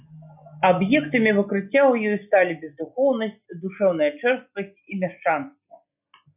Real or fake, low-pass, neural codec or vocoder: real; 3.6 kHz; none